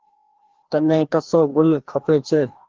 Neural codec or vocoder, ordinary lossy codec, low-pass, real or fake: codec, 16 kHz, 1 kbps, FreqCodec, larger model; Opus, 16 kbps; 7.2 kHz; fake